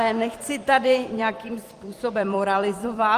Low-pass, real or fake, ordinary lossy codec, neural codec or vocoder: 14.4 kHz; fake; Opus, 24 kbps; vocoder, 44.1 kHz, 128 mel bands every 256 samples, BigVGAN v2